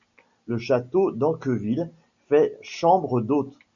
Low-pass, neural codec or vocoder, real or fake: 7.2 kHz; none; real